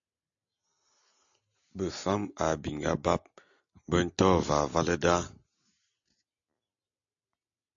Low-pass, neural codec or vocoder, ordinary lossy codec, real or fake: 7.2 kHz; none; AAC, 64 kbps; real